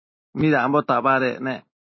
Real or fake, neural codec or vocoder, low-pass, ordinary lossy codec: real; none; 7.2 kHz; MP3, 24 kbps